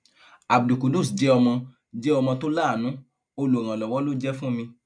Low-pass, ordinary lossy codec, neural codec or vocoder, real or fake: 9.9 kHz; none; none; real